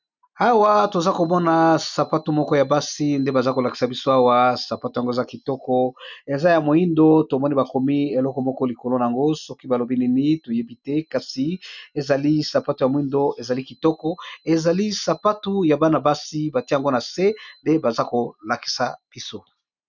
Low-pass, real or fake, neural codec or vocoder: 7.2 kHz; real; none